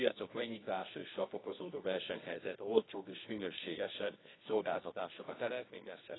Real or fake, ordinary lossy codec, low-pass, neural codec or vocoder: fake; AAC, 16 kbps; 7.2 kHz; codec, 24 kHz, 0.9 kbps, WavTokenizer, medium music audio release